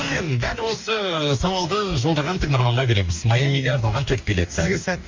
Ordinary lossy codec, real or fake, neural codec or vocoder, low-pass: MP3, 64 kbps; fake; codec, 44.1 kHz, 2.6 kbps, DAC; 7.2 kHz